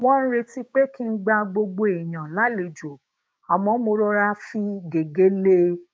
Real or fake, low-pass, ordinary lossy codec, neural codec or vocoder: fake; none; none; codec, 16 kHz, 6 kbps, DAC